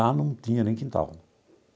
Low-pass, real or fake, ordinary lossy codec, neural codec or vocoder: none; real; none; none